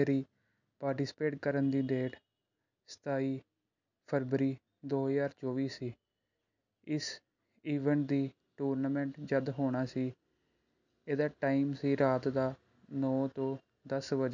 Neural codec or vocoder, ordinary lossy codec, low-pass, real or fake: none; none; 7.2 kHz; real